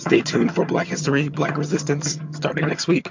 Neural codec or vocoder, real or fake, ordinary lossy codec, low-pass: vocoder, 22.05 kHz, 80 mel bands, HiFi-GAN; fake; MP3, 48 kbps; 7.2 kHz